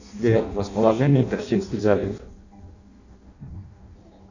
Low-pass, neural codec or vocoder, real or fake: 7.2 kHz; codec, 16 kHz in and 24 kHz out, 0.6 kbps, FireRedTTS-2 codec; fake